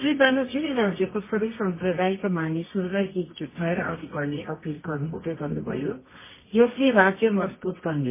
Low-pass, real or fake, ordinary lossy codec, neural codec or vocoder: 3.6 kHz; fake; MP3, 16 kbps; codec, 24 kHz, 0.9 kbps, WavTokenizer, medium music audio release